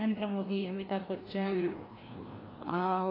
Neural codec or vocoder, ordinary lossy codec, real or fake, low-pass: codec, 16 kHz, 1 kbps, FreqCodec, larger model; AAC, 24 kbps; fake; 5.4 kHz